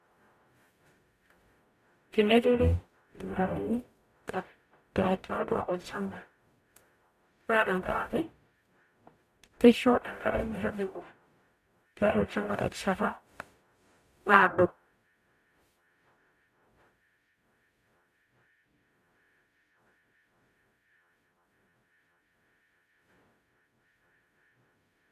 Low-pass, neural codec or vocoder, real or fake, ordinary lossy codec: 14.4 kHz; codec, 44.1 kHz, 0.9 kbps, DAC; fake; none